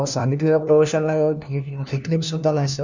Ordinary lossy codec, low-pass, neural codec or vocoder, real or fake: none; 7.2 kHz; codec, 16 kHz, 1 kbps, FunCodec, trained on LibriTTS, 50 frames a second; fake